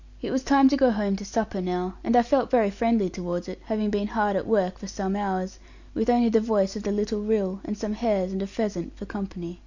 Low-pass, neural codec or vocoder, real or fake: 7.2 kHz; none; real